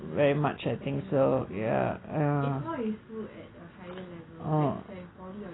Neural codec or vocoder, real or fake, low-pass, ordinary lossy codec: none; real; 7.2 kHz; AAC, 16 kbps